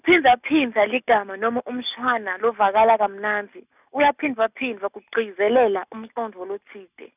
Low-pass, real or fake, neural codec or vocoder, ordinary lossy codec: 3.6 kHz; real; none; none